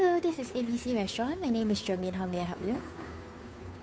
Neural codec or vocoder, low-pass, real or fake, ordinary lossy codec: codec, 16 kHz, 2 kbps, FunCodec, trained on Chinese and English, 25 frames a second; none; fake; none